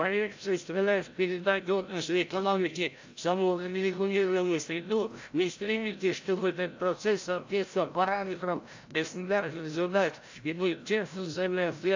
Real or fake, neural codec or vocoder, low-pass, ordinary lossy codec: fake; codec, 16 kHz, 0.5 kbps, FreqCodec, larger model; 7.2 kHz; AAC, 48 kbps